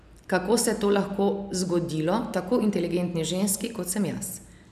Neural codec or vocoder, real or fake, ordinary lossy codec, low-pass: none; real; none; 14.4 kHz